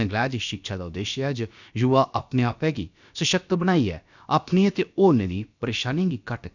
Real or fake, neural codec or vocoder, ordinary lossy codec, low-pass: fake; codec, 16 kHz, about 1 kbps, DyCAST, with the encoder's durations; none; 7.2 kHz